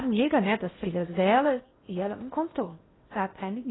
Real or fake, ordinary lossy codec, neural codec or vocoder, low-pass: fake; AAC, 16 kbps; codec, 16 kHz in and 24 kHz out, 0.8 kbps, FocalCodec, streaming, 65536 codes; 7.2 kHz